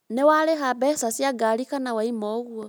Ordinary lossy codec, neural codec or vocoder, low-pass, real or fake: none; none; none; real